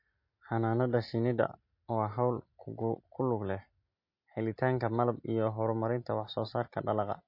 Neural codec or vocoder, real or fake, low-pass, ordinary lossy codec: none; real; 5.4 kHz; MP3, 32 kbps